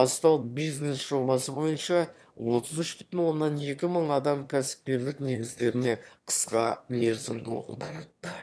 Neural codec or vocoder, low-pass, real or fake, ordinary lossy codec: autoencoder, 22.05 kHz, a latent of 192 numbers a frame, VITS, trained on one speaker; none; fake; none